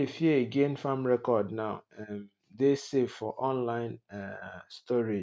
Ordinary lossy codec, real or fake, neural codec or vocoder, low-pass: none; real; none; none